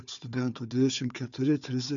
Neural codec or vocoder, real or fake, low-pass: codec, 16 kHz, 4 kbps, FunCodec, trained on Chinese and English, 50 frames a second; fake; 7.2 kHz